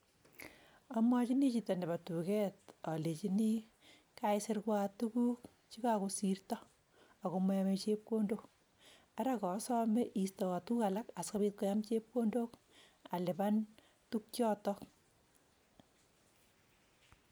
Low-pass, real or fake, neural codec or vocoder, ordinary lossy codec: none; real; none; none